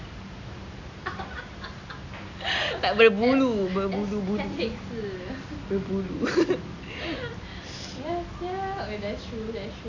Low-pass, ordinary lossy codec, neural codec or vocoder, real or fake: 7.2 kHz; none; none; real